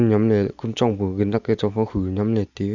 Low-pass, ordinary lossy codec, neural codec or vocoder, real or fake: 7.2 kHz; Opus, 64 kbps; none; real